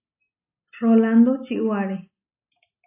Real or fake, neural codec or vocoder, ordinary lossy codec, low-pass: real; none; AAC, 24 kbps; 3.6 kHz